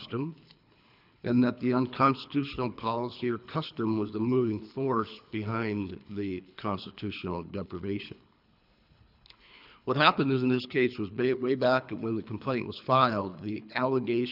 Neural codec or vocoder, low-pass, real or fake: codec, 24 kHz, 3 kbps, HILCodec; 5.4 kHz; fake